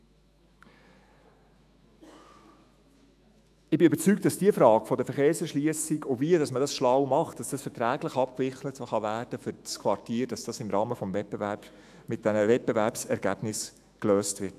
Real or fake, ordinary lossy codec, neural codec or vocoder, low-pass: fake; AAC, 96 kbps; autoencoder, 48 kHz, 128 numbers a frame, DAC-VAE, trained on Japanese speech; 14.4 kHz